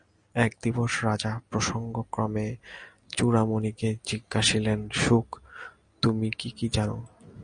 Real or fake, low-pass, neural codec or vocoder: real; 9.9 kHz; none